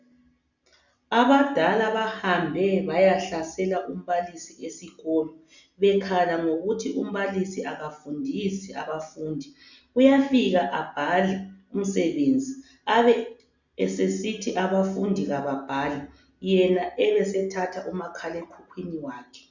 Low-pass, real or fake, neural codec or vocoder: 7.2 kHz; real; none